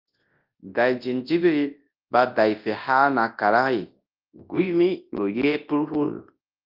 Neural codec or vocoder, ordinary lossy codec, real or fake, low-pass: codec, 24 kHz, 0.9 kbps, WavTokenizer, large speech release; Opus, 24 kbps; fake; 5.4 kHz